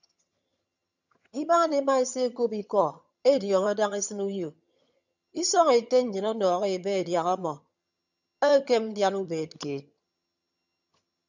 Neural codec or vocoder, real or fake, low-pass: vocoder, 22.05 kHz, 80 mel bands, HiFi-GAN; fake; 7.2 kHz